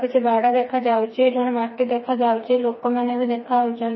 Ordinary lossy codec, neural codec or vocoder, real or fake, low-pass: MP3, 24 kbps; codec, 16 kHz, 2 kbps, FreqCodec, smaller model; fake; 7.2 kHz